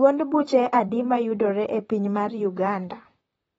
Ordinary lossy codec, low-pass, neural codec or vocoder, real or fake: AAC, 24 kbps; 19.8 kHz; vocoder, 44.1 kHz, 128 mel bands, Pupu-Vocoder; fake